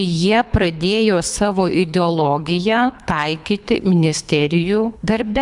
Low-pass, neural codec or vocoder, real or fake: 10.8 kHz; codec, 24 kHz, 3 kbps, HILCodec; fake